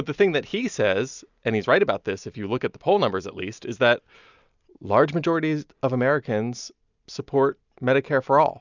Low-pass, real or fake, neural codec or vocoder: 7.2 kHz; real; none